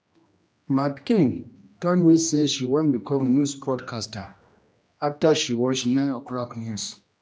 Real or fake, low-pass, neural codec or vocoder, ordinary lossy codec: fake; none; codec, 16 kHz, 1 kbps, X-Codec, HuBERT features, trained on general audio; none